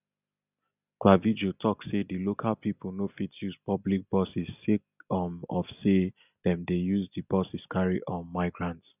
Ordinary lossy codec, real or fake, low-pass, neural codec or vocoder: none; real; 3.6 kHz; none